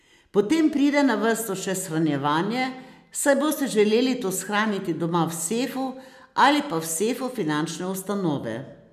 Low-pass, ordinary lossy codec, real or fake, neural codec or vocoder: 14.4 kHz; none; real; none